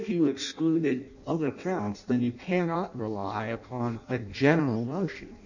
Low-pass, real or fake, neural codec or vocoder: 7.2 kHz; fake; codec, 16 kHz in and 24 kHz out, 0.6 kbps, FireRedTTS-2 codec